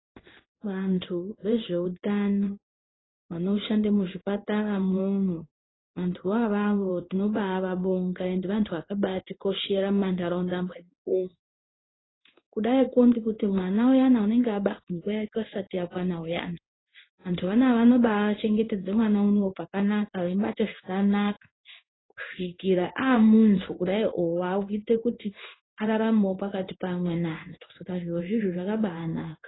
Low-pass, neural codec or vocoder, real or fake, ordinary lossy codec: 7.2 kHz; codec, 16 kHz in and 24 kHz out, 1 kbps, XY-Tokenizer; fake; AAC, 16 kbps